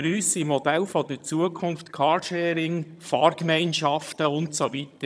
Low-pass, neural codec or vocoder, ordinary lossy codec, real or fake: none; vocoder, 22.05 kHz, 80 mel bands, HiFi-GAN; none; fake